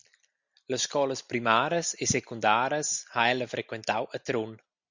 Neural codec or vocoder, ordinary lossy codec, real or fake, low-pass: none; Opus, 64 kbps; real; 7.2 kHz